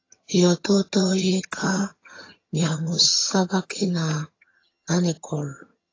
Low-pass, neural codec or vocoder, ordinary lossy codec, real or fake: 7.2 kHz; vocoder, 22.05 kHz, 80 mel bands, HiFi-GAN; AAC, 32 kbps; fake